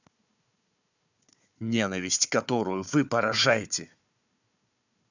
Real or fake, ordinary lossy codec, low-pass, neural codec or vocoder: fake; none; 7.2 kHz; codec, 16 kHz, 4 kbps, FunCodec, trained on Chinese and English, 50 frames a second